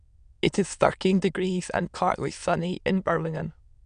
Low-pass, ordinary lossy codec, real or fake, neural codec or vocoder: 9.9 kHz; none; fake; autoencoder, 22.05 kHz, a latent of 192 numbers a frame, VITS, trained on many speakers